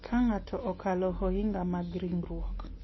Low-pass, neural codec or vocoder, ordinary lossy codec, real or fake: 7.2 kHz; none; MP3, 24 kbps; real